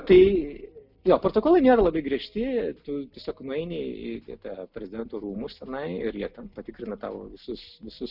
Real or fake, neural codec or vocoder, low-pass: real; none; 5.4 kHz